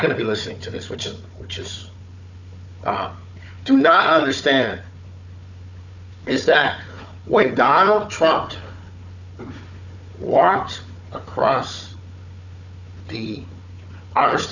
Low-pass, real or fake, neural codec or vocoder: 7.2 kHz; fake; codec, 16 kHz, 4 kbps, FunCodec, trained on Chinese and English, 50 frames a second